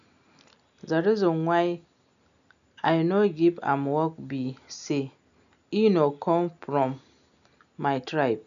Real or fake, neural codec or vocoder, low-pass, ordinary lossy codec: real; none; 7.2 kHz; none